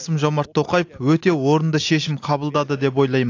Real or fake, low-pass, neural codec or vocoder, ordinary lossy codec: real; 7.2 kHz; none; AAC, 48 kbps